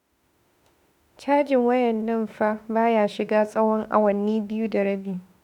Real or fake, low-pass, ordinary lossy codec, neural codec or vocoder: fake; 19.8 kHz; none; autoencoder, 48 kHz, 32 numbers a frame, DAC-VAE, trained on Japanese speech